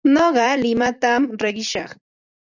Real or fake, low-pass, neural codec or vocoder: fake; 7.2 kHz; vocoder, 44.1 kHz, 128 mel bands every 256 samples, BigVGAN v2